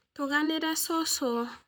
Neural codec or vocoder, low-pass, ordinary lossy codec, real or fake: vocoder, 44.1 kHz, 128 mel bands, Pupu-Vocoder; none; none; fake